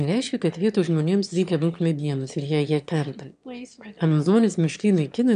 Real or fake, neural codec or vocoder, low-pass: fake; autoencoder, 22.05 kHz, a latent of 192 numbers a frame, VITS, trained on one speaker; 9.9 kHz